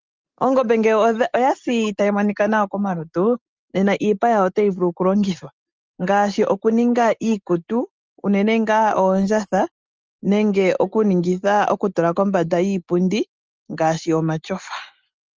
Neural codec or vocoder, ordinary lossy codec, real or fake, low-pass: none; Opus, 24 kbps; real; 7.2 kHz